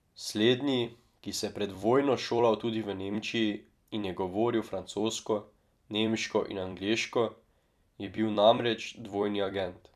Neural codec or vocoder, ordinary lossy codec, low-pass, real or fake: vocoder, 44.1 kHz, 128 mel bands every 256 samples, BigVGAN v2; none; 14.4 kHz; fake